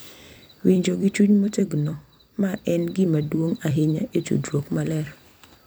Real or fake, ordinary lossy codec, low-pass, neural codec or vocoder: fake; none; none; vocoder, 44.1 kHz, 128 mel bands every 256 samples, BigVGAN v2